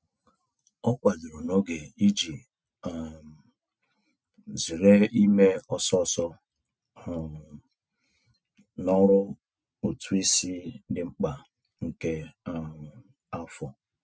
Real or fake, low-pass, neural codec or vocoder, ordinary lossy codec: real; none; none; none